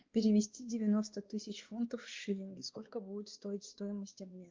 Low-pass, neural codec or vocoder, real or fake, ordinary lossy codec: 7.2 kHz; codec, 16 kHz, 2 kbps, X-Codec, WavLM features, trained on Multilingual LibriSpeech; fake; Opus, 24 kbps